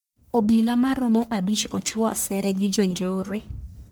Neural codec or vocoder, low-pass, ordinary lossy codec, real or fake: codec, 44.1 kHz, 1.7 kbps, Pupu-Codec; none; none; fake